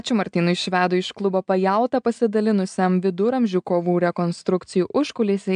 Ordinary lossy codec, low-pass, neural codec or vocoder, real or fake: AAC, 96 kbps; 9.9 kHz; none; real